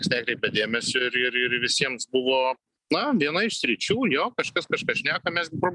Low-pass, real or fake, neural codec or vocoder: 10.8 kHz; real; none